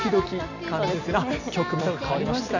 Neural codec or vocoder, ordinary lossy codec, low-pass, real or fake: none; none; 7.2 kHz; real